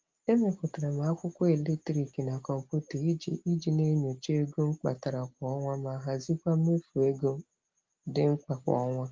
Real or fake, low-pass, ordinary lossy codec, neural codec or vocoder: real; 7.2 kHz; Opus, 32 kbps; none